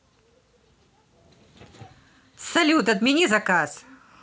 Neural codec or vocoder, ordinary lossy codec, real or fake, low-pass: none; none; real; none